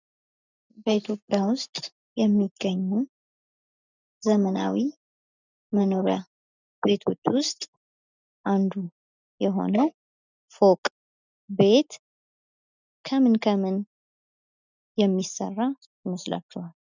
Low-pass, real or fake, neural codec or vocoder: 7.2 kHz; real; none